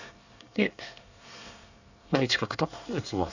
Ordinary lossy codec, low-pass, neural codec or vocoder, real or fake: none; 7.2 kHz; codec, 24 kHz, 1 kbps, SNAC; fake